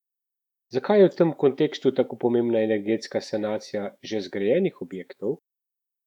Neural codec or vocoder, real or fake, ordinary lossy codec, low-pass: autoencoder, 48 kHz, 128 numbers a frame, DAC-VAE, trained on Japanese speech; fake; none; 19.8 kHz